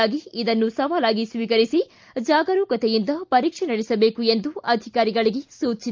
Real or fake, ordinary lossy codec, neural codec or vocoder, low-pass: real; Opus, 24 kbps; none; 7.2 kHz